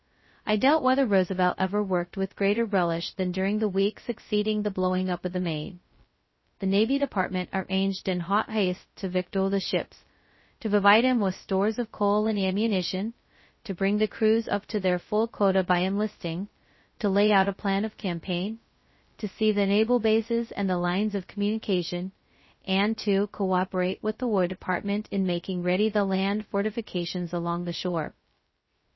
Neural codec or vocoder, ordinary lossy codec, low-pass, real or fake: codec, 16 kHz, 0.2 kbps, FocalCodec; MP3, 24 kbps; 7.2 kHz; fake